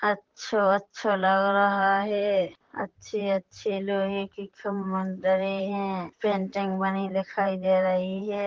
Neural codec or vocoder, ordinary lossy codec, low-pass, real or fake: vocoder, 44.1 kHz, 128 mel bands, Pupu-Vocoder; Opus, 16 kbps; 7.2 kHz; fake